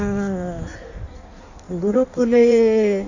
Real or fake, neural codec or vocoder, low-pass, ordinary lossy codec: fake; codec, 16 kHz in and 24 kHz out, 1.1 kbps, FireRedTTS-2 codec; 7.2 kHz; Opus, 64 kbps